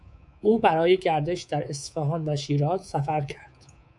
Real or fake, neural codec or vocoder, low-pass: fake; codec, 24 kHz, 3.1 kbps, DualCodec; 10.8 kHz